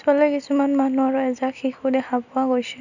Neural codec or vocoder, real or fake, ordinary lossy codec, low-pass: none; real; none; 7.2 kHz